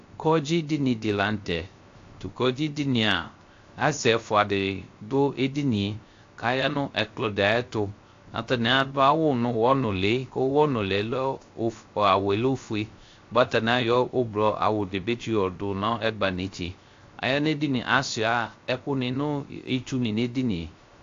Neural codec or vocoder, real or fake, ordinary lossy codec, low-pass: codec, 16 kHz, 0.3 kbps, FocalCodec; fake; AAC, 48 kbps; 7.2 kHz